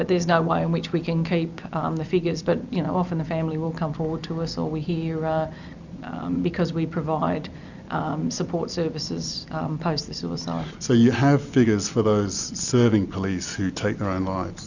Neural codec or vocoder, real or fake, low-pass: none; real; 7.2 kHz